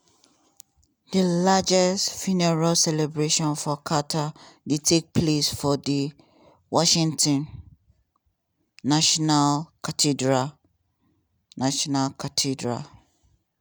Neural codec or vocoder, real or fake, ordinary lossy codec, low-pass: none; real; none; none